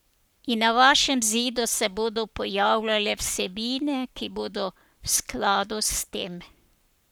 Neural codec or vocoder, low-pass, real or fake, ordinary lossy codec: codec, 44.1 kHz, 7.8 kbps, Pupu-Codec; none; fake; none